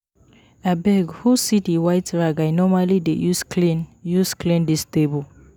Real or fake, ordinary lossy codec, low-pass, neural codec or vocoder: real; none; none; none